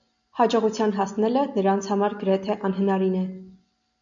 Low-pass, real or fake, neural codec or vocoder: 7.2 kHz; real; none